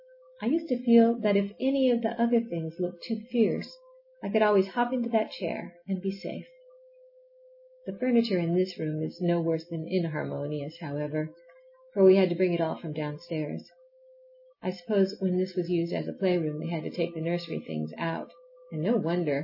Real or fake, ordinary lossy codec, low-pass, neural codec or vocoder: real; MP3, 24 kbps; 5.4 kHz; none